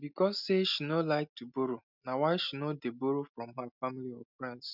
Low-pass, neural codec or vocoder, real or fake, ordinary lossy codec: 5.4 kHz; none; real; none